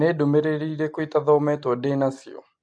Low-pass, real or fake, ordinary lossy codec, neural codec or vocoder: 9.9 kHz; real; AAC, 64 kbps; none